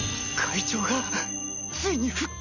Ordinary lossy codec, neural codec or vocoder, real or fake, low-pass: none; none; real; 7.2 kHz